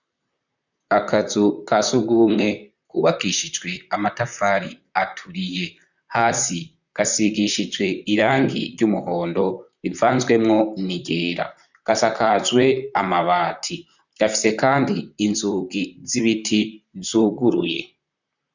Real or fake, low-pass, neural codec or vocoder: fake; 7.2 kHz; vocoder, 44.1 kHz, 128 mel bands, Pupu-Vocoder